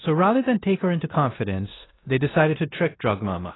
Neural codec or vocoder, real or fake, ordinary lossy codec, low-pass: codec, 16 kHz in and 24 kHz out, 0.9 kbps, LongCat-Audio-Codec, four codebook decoder; fake; AAC, 16 kbps; 7.2 kHz